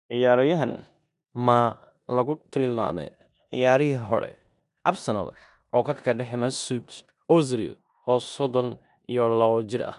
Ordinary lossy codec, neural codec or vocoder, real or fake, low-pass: none; codec, 16 kHz in and 24 kHz out, 0.9 kbps, LongCat-Audio-Codec, four codebook decoder; fake; 10.8 kHz